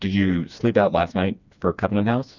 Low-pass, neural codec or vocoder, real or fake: 7.2 kHz; codec, 16 kHz, 2 kbps, FreqCodec, smaller model; fake